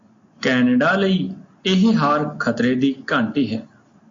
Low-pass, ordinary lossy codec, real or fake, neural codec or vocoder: 7.2 kHz; AAC, 48 kbps; real; none